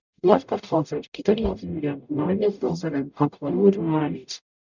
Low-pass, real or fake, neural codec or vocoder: 7.2 kHz; fake; codec, 44.1 kHz, 0.9 kbps, DAC